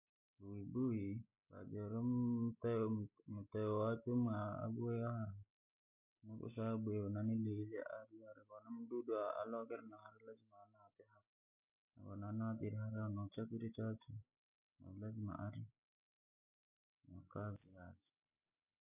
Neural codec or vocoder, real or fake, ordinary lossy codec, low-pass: none; real; none; 3.6 kHz